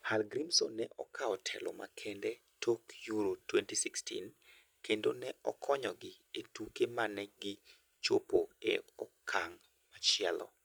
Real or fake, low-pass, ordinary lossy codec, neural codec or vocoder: real; none; none; none